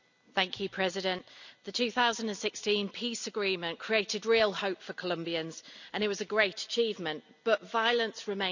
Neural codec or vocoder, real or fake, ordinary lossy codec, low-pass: none; real; none; 7.2 kHz